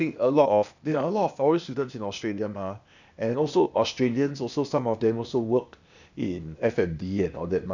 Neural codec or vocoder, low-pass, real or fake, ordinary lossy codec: codec, 16 kHz, 0.8 kbps, ZipCodec; 7.2 kHz; fake; none